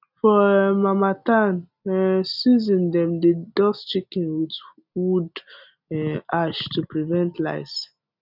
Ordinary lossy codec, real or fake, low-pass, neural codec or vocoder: none; real; 5.4 kHz; none